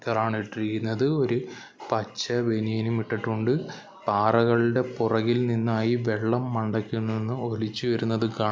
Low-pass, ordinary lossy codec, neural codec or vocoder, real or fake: none; none; none; real